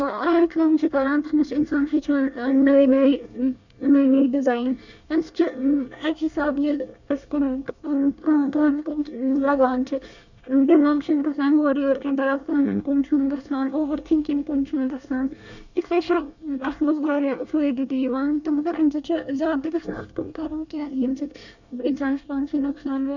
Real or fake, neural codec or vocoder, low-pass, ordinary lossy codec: fake; codec, 24 kHz, 1 kbps, SNAC; 7.2 kHz; none